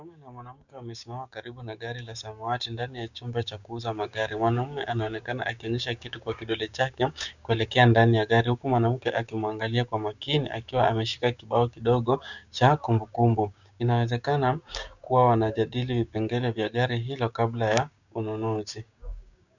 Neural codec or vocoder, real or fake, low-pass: codec, 16 kHz, 6 kbps, DAC; fake; 7.2 kHz